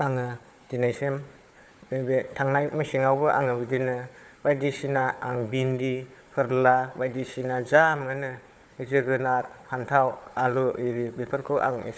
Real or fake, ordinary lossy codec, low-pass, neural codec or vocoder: fake; none; none; codec, 16 kHz, 4 kbps, FunCodec, trained on Chinese and English, 50 frames a second